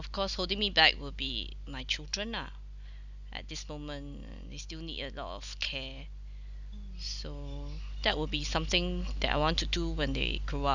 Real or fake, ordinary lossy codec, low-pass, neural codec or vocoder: real; none; 7.2 kHz; none